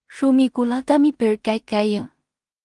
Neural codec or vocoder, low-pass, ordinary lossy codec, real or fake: codec, 16 kHz in and 24 kHz out, 0.4 kbps, LongCat-Audio-Codec, two codebook decoder; 10.8 kHz; Opus, 24 kbps; fake